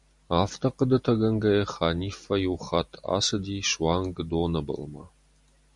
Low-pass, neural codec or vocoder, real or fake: 10.8 kHz; none; real